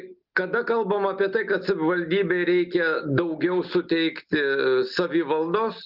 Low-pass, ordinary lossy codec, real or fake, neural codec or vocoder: 5.4 kHz; Opus, 24 kbps; real; none